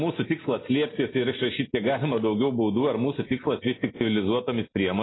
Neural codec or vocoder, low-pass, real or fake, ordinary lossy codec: none; 7.2 kHz; real; AAC, 16 kbps